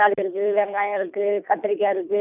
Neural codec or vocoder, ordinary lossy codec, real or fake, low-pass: codec, 24 kHz, 3 kbps, HILCodec; none; fake; 3.6 kHz